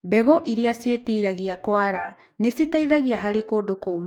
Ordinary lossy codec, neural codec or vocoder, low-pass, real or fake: none; codec, 44.1 kHz, 2.6 kbps, DAC; 19.8 kHz; fake